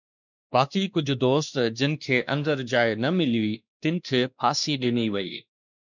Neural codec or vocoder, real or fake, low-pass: codec, 16 kHz, 2 kbps, X-Codec, WavLM features, trained on Multilingual LibriSpeech; fake; 7.2 kHz